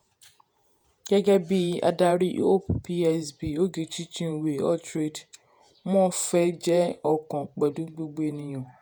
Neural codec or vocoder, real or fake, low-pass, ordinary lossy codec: vocoder, 48 kHz, 128 mel bands, Vocos; fake; none; none